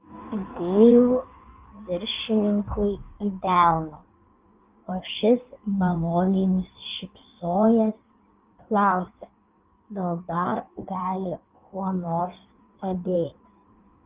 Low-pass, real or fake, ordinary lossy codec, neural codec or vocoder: 3.6 kHz; fake; Opus, 32 kbps; codec, 16 kHz in and 24 kHz out, 1.1 kbps, FireRedTTS-2 codec